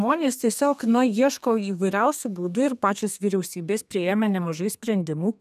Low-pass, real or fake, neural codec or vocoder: 14.4 kHz; fake; codec, 32 kHz, 1.9 kbps, SNAC